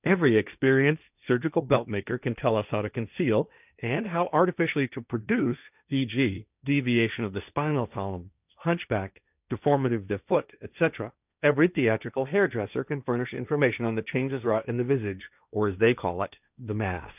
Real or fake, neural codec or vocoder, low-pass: fake; codec, 16 kHz, 1.1 kbps, Voila-Tokenizer; 3.6 kHz